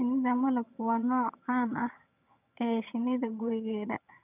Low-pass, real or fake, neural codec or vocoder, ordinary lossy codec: 3.6 kHz; fake; vocoder, 22.05 kHz, 80 mel bands, HiFi-GAN; none